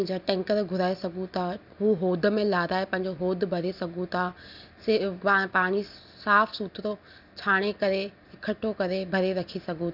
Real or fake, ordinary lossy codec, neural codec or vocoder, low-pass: real; none; none; 5.4 kHz